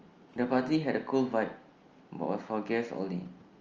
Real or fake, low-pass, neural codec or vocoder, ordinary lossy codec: real; 7.2 kHz; none; Opus, 24 kbps